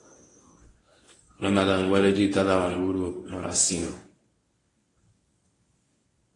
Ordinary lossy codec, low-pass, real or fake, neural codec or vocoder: AAC, 32 kbps; 10.8 kHz; fake; codec, 24 kHz, 0.9 kbps, WavTokenizer, medium speech release version 1